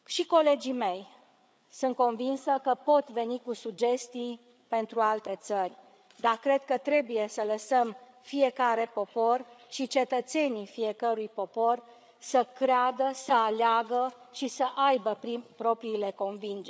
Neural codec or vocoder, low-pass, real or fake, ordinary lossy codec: codec, 16 kHz, 8 kbps, FreqCodec, larger model; none; fake; none